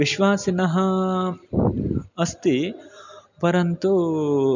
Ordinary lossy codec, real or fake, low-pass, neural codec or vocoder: none; real; 7.2 kHz; none